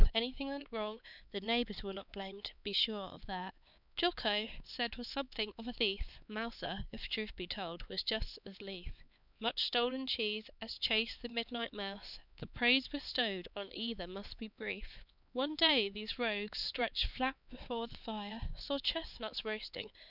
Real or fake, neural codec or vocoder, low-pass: fake; codec, 16 kHz, 4 kbps, X-Codec, HuBERT features, trained on LibriSpeech; 5.4 kHz